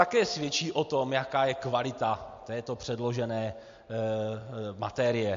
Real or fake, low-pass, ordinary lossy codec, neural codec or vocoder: real; 7.2 kHz; MP3, 48 kbps; none